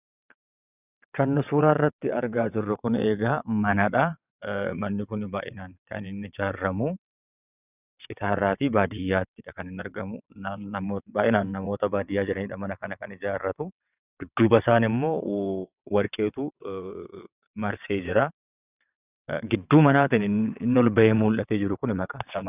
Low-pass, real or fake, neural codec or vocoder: 3.6 kHz; fake; vocoder, 22.05 kHz, 80 mel bands, WaveNeXt